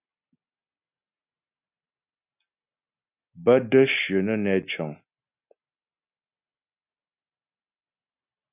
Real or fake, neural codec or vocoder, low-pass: real; none; 3.6 kHz